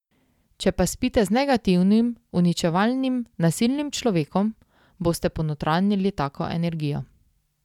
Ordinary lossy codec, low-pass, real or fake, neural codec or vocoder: none; 19.8 kHz; real; none